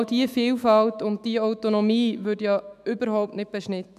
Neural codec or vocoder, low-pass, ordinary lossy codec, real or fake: autoencoder, 48 kHz, 128 numbers a frame, DAC-VAE, trained on Japanese speech; 14.4 kHz; MP3, 96 kbps; fake